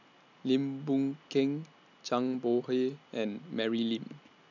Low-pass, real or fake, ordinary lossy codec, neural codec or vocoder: 7.2 kHz; real; none; none